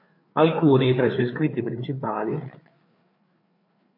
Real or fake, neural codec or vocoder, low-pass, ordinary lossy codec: fake; codec, 16 kHz, 4 kbps, FreqCodec, larger model; 5.4 kHz; MP3, 32 kbps